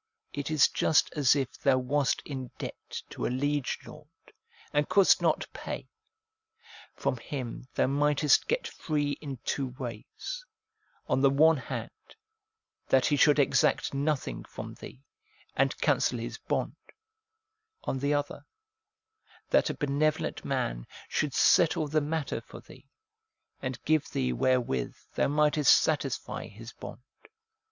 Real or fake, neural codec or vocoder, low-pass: real; none; 7.2 kHz